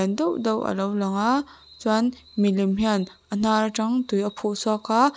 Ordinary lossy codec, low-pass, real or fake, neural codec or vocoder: none; none; real; none